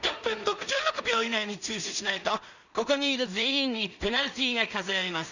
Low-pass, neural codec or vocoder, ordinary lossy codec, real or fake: 7.2 kHz; codec, 16 kHz in and 24 kHz out, 0.4 kbps, LongCat-Audio-Codec, two codebook decoder; none; fake